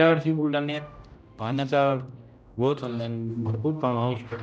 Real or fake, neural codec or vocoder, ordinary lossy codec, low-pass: fake; codec, 16 kHz, 0.5 kbps, X-Codec, HuBERT features, trained on general audio; none; none